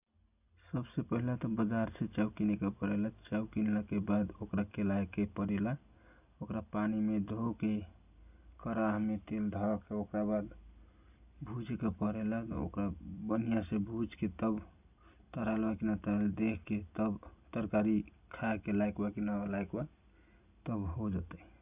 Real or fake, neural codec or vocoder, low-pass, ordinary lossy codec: real; none; 3.6 kHz; none